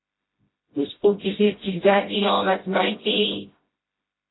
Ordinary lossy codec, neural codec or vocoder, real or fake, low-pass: AAC, 16 kbps; codec, 16 kHz, 0.5 kbps, FreqCodec, smaller model; fake; 7.2 kHz